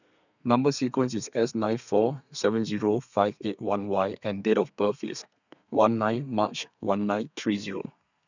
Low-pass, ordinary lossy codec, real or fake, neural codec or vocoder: 7.2 kHz; none; fake; codec, 32 kHz, 1.9 kbps, SNAC